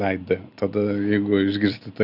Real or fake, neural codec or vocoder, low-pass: real; none; 5.4 kHz